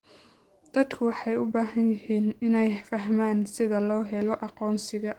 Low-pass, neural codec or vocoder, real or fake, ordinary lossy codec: 19.8 kHz; autoencoder, 48 kHz, 128 numbers a frame, DAC-VAE, trained on Japanese speech; fake; Opus, 16 kbps